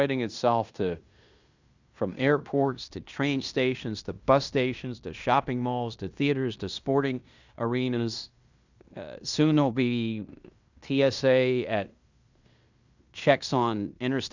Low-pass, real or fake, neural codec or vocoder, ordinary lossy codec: 7.2 kHz; fake; codec, 16 kHz in and 24 kHz out, 0.9 kbps, LongCat-Audio-Codec, fine tuned four codebook decoder; Opus, 64 kbps